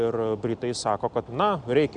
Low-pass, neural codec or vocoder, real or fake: 9.9 kHz; none; real